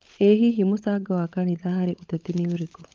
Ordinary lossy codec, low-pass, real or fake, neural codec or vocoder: Opus, 24 kbps; 7.2 kHz; fake; codec, 16 kHz, 16 kbps, FunCodec, trained on LibriTTS, 50 frames a second